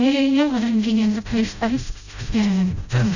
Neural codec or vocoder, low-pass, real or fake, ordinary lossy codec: codec, 16 kHz, 0.5 kbps, FreqCodec, smaller model; 7.2 kHz; fake; none